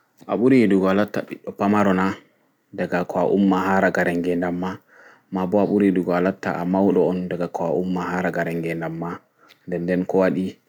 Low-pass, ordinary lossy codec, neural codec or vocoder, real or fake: 19.8 kHz; none; none; real